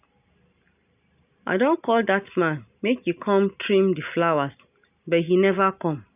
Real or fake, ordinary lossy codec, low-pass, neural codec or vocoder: real; none; 3.6 kHz; none